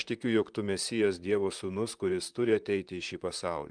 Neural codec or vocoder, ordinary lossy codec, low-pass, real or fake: vocoder, 44.1 kHz, 128 mel bands, Pupu-Vocoder; Opus, 32 kbps; 9.9 kHz; fake